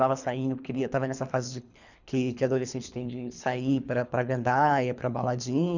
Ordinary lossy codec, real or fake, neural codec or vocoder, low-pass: none; fake; codec, 24 kHz, 3 kbps, HILCodec; 7.2 kHz